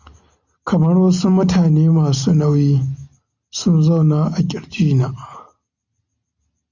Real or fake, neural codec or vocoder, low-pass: real; none; 7.2 kHz